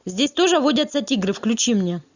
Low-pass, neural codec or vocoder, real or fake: 7.2 kHz; none; real